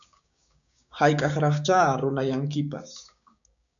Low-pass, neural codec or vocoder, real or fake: 7.2 kHz; codec, 16 kHz, 6 kbps, DAC; fake